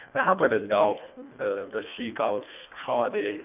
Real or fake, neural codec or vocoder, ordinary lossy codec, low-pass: fake; codec, 24 kHz, 1.5 kbps, HILCodec; none; 3.6 kHz